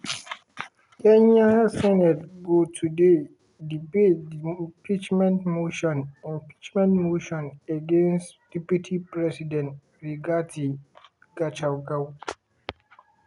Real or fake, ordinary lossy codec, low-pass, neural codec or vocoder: real; none; 10.8 kHz; none